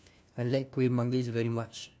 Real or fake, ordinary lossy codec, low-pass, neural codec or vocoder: fake; none; none; codec, 16 kHz, 1 kbps, FunCodec, trained on LibriTTS, 50 frames a second